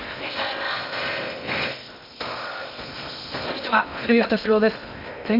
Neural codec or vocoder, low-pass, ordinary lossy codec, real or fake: codec, 16 kHz in and 24 kHz out, 0.6 kbps, FocalCodec, streaming, 2048 codes; 5.4 kHz; none; fake